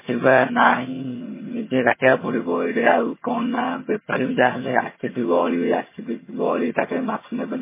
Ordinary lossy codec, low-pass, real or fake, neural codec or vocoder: MP3, 16 kbps; 3.6 kHz; fake; vocoder, 22.05 kHz, 80 mel bands, HiFi-GAN